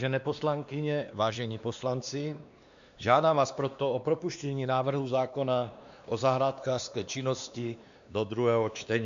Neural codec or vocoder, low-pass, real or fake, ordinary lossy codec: codec, 16 kHz, 2 kbps, X-Codec, WavLM features, trained on Multilingual LibriSpeech; 7.2 kHz; fake; MP3, 64 kbps